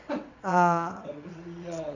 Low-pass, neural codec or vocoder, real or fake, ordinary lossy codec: 7.2 kHz; vocoder, 22.05 kHz, 80 mel bands, WaveNeXt; fake; none